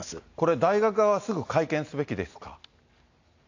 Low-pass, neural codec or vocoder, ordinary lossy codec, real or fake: 7.2 kHz; none; AAC, 48 kbps; real